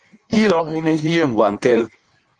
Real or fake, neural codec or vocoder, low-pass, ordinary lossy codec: fake; codec, 16 kHz in and 24 kHz out, 1.1 kbps, FireRedTTS-2 codec; 9.9 kHz; Opus, 32 kbps